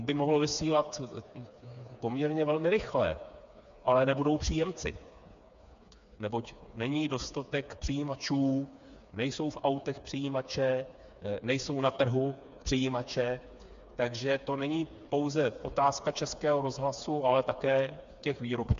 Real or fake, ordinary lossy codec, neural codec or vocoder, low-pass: fake; MP3, 64 kbps; codec, 16 kHz, 4 kbps, FreqCodec, smaller model; 7.2 kHz